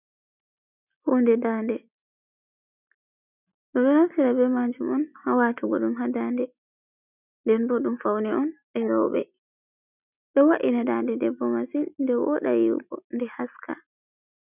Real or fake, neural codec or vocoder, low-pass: real; none; 3.6 kHz